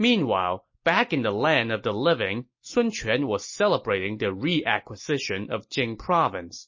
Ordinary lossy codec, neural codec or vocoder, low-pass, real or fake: MP3, 32 kbps; none; 7.2 kHz; real